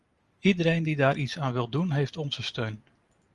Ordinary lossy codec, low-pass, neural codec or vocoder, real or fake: Opus, 32 kbps; 10.8 kHz; none; real